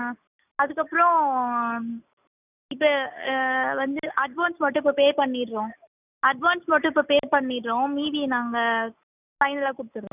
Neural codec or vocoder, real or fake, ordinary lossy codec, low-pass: none; real; none; 3.6 kHz